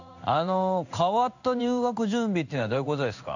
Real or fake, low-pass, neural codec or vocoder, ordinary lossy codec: fake; 7.2 kHz; codec, 16 kHz in and 24 kHz out, 1 kbps, XY-Tokenizer; none